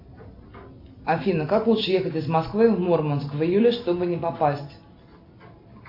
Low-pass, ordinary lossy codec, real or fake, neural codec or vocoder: 5.4 kHz; MP3, 32 kbps; real; none